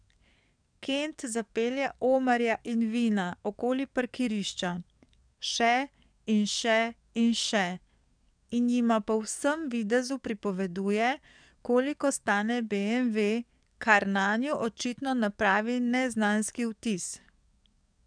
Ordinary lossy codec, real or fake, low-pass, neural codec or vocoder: none; fake; 9.9 kHz; codec, 44.1 kHz, 7.8 kbps, DAC